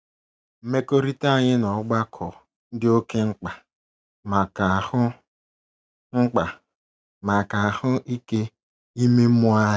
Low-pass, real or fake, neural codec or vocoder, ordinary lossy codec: none; real; none; none